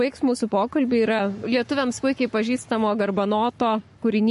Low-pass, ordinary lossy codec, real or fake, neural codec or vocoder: 14.4 kHz; MP3, 48 kbps; fake; codec, 44.1 kHz, 7.8 kbps, Pupu-Codec